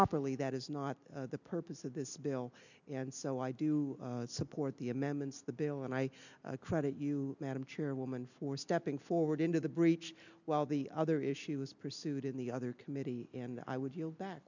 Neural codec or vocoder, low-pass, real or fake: none; 7.2 kHz; real